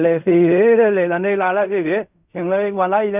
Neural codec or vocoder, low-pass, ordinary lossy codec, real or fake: codec, 16 kHz in and 24 kHz out, 0.4 kbps, LongCat-Audio-Codec, fine tuned four codebook decoder; 3.6 kHz; none; fake